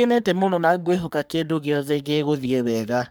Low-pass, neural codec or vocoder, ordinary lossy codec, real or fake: none; codec, 44.1 kHz, 3.4 kbps, Pupu-Codec; none; fake